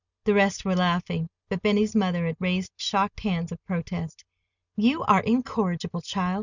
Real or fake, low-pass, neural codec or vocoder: real; 7.2 kHz; none